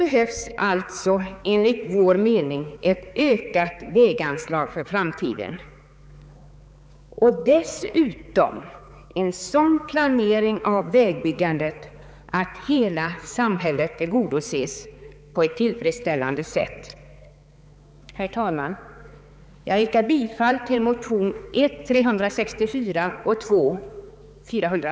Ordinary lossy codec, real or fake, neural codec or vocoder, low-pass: none; fake; codec, 16 kHz, 4 kbps, X-Codec, HuBERT features, trained on balanced general audio; none